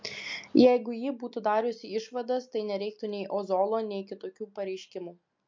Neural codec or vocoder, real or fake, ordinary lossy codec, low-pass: none; real; MP3, 48 kbps; 7.2 kHz